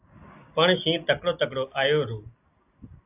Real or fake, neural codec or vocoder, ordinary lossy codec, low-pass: real; none; AAC, 32 kbps; 3.6 kHz